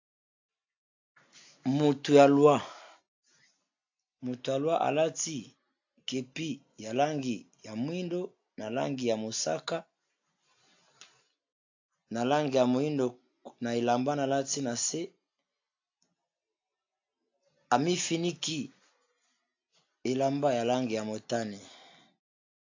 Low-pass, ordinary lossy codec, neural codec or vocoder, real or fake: 7.2 kHz; AAC, 48 kbps; none; real